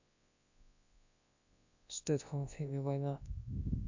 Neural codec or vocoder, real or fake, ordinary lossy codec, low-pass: codec, 24 kHz, 0.9 kbps, WavTokenizer, large speech release; fake; AAC, 48 kbps; 7.2 kHz